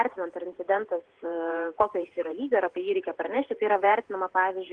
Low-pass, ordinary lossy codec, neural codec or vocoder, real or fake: 9.9 kHz; Opus, 16 kbps; vocoder, 48 kHz, 128 mel bands, Vocos; fake